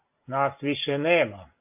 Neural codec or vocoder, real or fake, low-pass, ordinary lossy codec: none; real; 3.6 kHz; AAC, 32 kbps